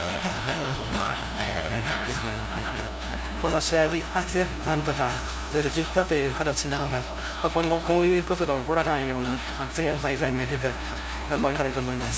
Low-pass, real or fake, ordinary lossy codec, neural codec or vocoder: none; fake; none; codec, 16 kHz, 0.5 kbps, FunCodec, trained on LibriTTS, 25 frames a second